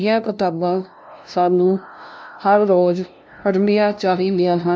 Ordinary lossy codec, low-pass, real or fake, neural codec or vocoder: none; none; fake; codec, 16 kHz, 0.5 kbps, FunCodec, trained on LibriTTS, 25 frames a second